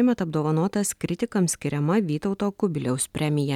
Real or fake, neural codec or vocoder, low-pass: real; none; 19.8 kHz